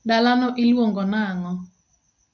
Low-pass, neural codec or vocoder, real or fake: 7.2 kHz; none; real